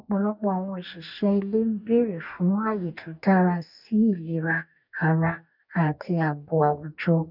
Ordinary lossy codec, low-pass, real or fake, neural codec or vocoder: none; 5.4 kHz; fake; codec, 44.1 kHz, 2.6 kbps, DAC